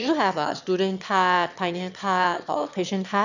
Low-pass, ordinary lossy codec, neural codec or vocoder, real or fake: 7.2 kHz; none; autoencoder, 22.05 kHz, a latent of 192 numbers a frame, VITS, trained on one speaker; fake